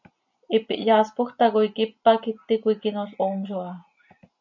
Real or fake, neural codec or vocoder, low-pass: real; none; 7.2 kHz